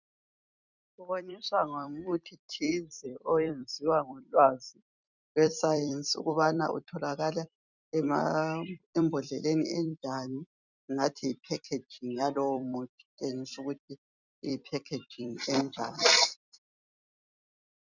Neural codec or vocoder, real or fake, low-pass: vocoder, 44.1 kHz, 128 mel bands every 256 samples, BigVGAN v2; fake; 7.2 kHz